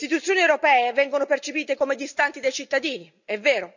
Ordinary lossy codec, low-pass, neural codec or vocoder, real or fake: none; 7.2 kHz; none; real